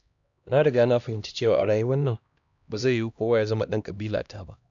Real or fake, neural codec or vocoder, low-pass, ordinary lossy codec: fake; codec, 16 kHz, 1 kbps, X-Codec, HuBERT features, trained on LibriSpeech; 7.2 kHz; none